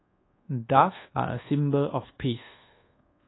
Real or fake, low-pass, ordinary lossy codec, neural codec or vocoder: fake; 7.2 kHz; AAC, 16 kbps; codec, 24 kHz, 1.2 kbps, DualCodec